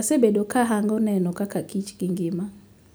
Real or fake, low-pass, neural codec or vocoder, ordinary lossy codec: real; none; none; none